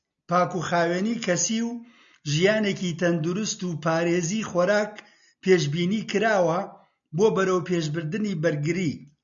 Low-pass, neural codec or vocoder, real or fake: 7.2 kHz; none; real